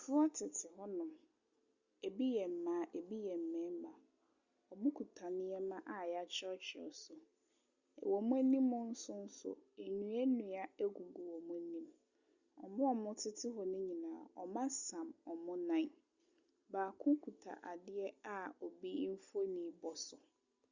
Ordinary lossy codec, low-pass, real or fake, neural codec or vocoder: Opus, 64 kbps; 7.2 kHz; real; none